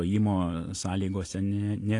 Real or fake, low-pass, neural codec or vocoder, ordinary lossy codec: real; 10.8 kHz; none; AAC, 48 kbps